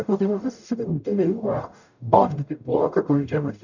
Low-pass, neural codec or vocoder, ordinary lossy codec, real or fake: 7.2 kHz; codec, 44.1 kHz, 0.9 kbps, DAC; none; fake